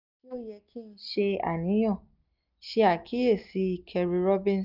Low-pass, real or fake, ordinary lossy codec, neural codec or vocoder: 5.4 kHz; real; none; none